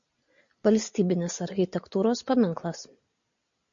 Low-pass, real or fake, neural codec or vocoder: 7.2 kHz; real; none